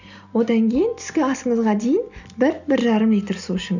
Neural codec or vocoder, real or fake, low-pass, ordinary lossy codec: none; real; 7.2 kHz; none